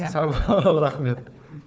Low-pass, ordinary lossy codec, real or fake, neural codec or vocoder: none; none; fake; codec, 16 kHz, 4 kbps, FunCodec, trained on Chinese and English, 50 frames a second